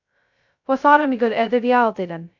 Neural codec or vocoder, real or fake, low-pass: codec, 16 kHz, 0.2 kbps, FocalCodec; fake; 7.2 kHz